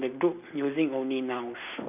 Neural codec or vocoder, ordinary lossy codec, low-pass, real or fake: none; none; 3.6 kHz; real